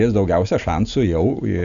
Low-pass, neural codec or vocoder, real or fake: 7.2 kHz; none; real